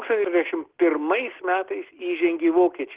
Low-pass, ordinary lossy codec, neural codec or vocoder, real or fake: 3.6 kHz; Opus, 16 kbps; none; real